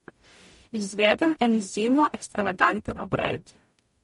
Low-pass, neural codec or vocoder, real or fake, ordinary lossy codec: 19.8 kHz; codec, 44.1 kHz, 0.9 kbps, DAC; fake; MP3, 48 kbps